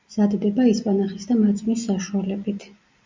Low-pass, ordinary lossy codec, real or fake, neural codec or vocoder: 7.2 kHz; MP3, 64 kbps; real; none